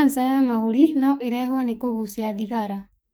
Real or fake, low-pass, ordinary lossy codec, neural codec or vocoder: fake; none; none; codec, 44.1 kHz, 2.6 kbps, SNAC